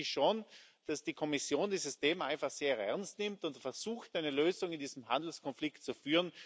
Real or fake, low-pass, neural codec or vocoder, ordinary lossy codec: real; none; none; none